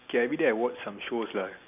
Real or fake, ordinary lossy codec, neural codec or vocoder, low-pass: real; none; none; 3.6 kHz